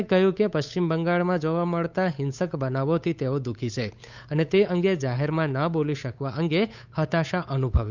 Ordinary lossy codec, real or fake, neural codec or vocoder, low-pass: none; fake; codec, 16 kHz, 8 kbps, FunCodec, trained on Chinese and English, 25 frames a second; 7.2 kHz